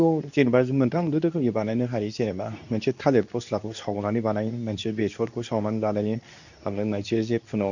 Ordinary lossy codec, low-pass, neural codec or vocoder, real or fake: none; 7.2 kHz; codec, 24 kHz, 0.9 kbps, WavTokenizer, medium speech release version 2; fake